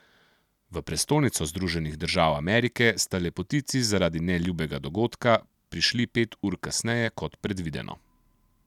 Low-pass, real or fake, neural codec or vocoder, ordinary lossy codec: 19.8 kHz; real; none; none